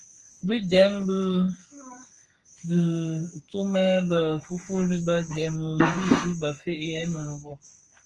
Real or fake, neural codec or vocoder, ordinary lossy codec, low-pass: fake; codec, 32 kHz, 1.9 kbps, SNAC; Opus, 24 kbps; 10.8 kHz